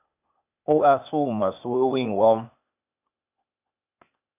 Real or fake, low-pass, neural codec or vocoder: fake; 3.6 kHz; codec, 16 kHz, 0.8 kbps, ZipCodec